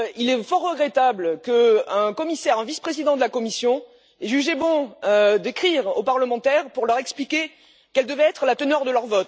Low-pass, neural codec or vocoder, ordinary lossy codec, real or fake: none; none; none; real